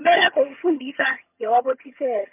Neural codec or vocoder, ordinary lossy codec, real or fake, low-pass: vocoder, 22.05 kHz, 80 mel bands, HiFi-GAN; MP3, 32 kbps; fake; 3.6 kHz